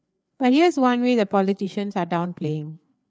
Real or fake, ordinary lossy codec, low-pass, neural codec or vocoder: fake; none; none; codec, 16 kHz, 4 kbps, FreqCodec, larger model